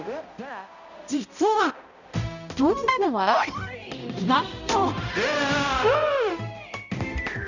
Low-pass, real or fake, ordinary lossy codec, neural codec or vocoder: 7.2 kHz; fake; none; codec, 16 kHz, 0.5 kbps, X-Codec, HuBERT features, trained on balanced general audio